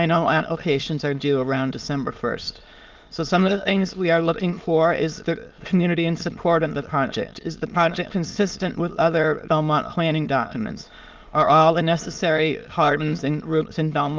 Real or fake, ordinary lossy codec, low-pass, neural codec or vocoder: fake; Opus, 32 kbps; 7.2 kHz; autoencoder, 22.05 kHz, a latent of 192 numbers a frame, VITS, trained on many speakers